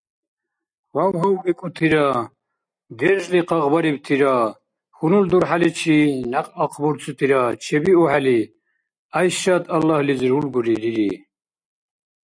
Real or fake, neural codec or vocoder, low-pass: real; none; 9.9 kHz